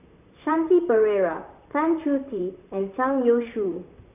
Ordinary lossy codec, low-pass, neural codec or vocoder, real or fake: none; 3.6 kHz; vocoder, 44.1 kHz, 128 mel bands, Pupu-Vocoder; fake